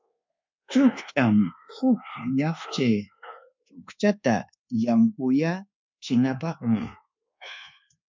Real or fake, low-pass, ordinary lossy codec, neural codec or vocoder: fake; 7.2 kHz; MP3, 64 kbps; codec, 24 kHz, 1.2 kbps, DualCodec